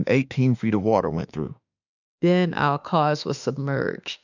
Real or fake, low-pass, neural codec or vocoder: fake; 7.2 kHz; autoencoder, 48 kHz, 32 numbers a frame, DAC-VAE, trained on Japanese speech